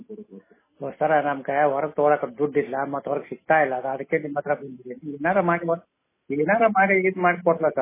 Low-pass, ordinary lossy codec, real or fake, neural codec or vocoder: 3.6 kHz; MP3, 16 kbps; real; none